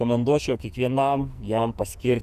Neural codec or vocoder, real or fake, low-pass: codec, 44.1 kHz, 2.6 kbps, SNAC; fake; 14.4 kHz